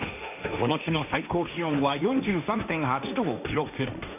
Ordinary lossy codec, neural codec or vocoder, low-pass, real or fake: none; codec, 16 kHz, 1.1 kbps, Voila-Tokenizer; 3.6 kHz; fake